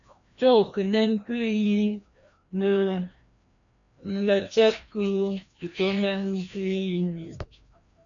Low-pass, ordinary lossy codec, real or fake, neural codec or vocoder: 7.2 kHz; MP3, 96 kbps; fake; codec, 16 kHz, 1 kbps, FreqCodec, larger model